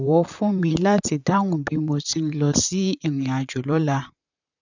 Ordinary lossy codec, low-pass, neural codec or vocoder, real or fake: none; 7.2 kHz; vocoder, 44.1 kHz, 128 mel bands, Pupu-Vocoder; fake